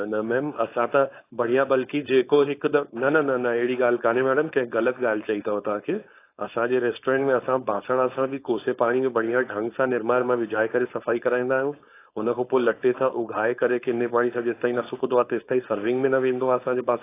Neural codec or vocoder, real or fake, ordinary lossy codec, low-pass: codec, 16 kHz, 4.8 kbps, FACodec; fake; AAC, 24 kbps; 3.6 kHz